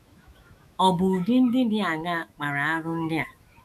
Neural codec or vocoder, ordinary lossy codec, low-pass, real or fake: autoencoder, 48 kHz, 128 numbers a frame, DAC-VAE, trained on Japanese speech; none; 14.4 kHz; fake